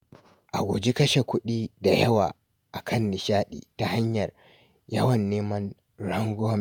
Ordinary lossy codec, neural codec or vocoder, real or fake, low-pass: none; vocoder, 48 kHz, 128 mel bands, Vocos; fake; none